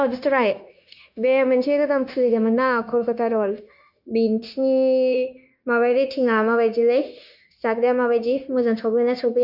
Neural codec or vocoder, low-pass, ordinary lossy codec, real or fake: codec, 16 kHz, 0.9 kbps, LongCat-Audio-Codec; 5.4 kHz; none; fake